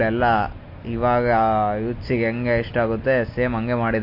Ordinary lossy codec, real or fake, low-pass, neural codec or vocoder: MP3, 32 kbps; real; 5.4 kHz; none